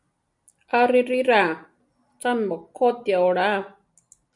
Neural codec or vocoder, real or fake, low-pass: none; real; 10.8 kHz